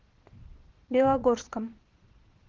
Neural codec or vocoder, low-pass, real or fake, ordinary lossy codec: vocoder, 22.05 kHz, 80 mel bands, Vocos; 7.2 kHz; fake; Opus, 16 kbps